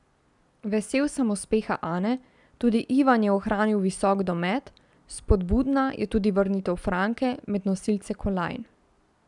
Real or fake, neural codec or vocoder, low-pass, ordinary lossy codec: real; none; 10.8 kHz; none